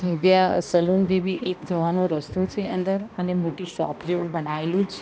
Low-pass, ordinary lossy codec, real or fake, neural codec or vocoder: none; none; fake; codec, 16 kHz, 1 kbps, X-Codec, HuBERT features, trained on balanced general audio